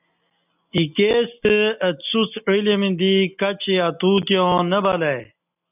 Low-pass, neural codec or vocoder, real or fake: 3.6 kHz; none; real